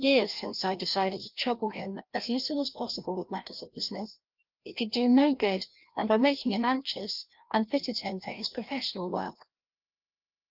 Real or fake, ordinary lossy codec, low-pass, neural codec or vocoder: fake; Opus, 32 kbps; 5.4 kHz; codec, 16 kHz, 1 kbps, FreqCodec, larger model